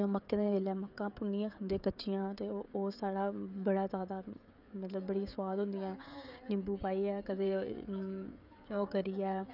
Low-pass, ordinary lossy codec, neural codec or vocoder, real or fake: 5.4 kHz; none; codec, 16 kHz, 16 kbps, FreqCodec, smaller model; fake